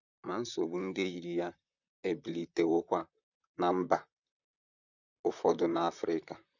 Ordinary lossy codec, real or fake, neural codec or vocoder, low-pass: none; fake; vocoder, 44.1 kHz, 128 mel bands, Pupu-Vocoder; 7.2 kHz